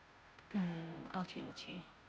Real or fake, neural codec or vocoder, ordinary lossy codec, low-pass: fake; codec, 16 kHz, 0.5 kbps, FunCodec, trained on Chinese and English, 25 frames a second; none; none